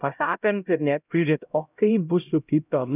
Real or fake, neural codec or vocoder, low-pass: fake; codec, 16 kHz, 0.5 kbps, X-Codec, HuBERT features, trained on LibriSpeech; 3.6 kHz